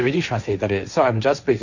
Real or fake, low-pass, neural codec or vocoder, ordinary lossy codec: fake; 7.2 kHz; codec, 16 kHz, 1.1 kbps, Voila-Tokenizer; none